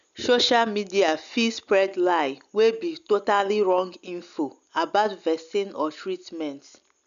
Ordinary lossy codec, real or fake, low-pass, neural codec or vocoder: none; real; 7.2 kHz; none